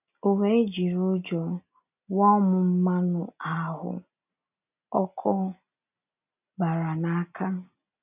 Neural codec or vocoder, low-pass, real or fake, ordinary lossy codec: none; 3.6 kHz; real; none